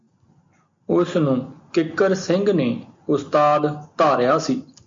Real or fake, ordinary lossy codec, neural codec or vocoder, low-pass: real; MP3, 48 kbps; none; 7.2 kHz